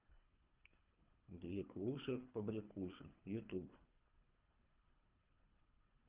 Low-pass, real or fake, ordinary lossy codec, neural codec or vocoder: 3.6 kHz; fake; Opus, 32 kbps; codec, 24 kHz, 3 kbps, HILCodec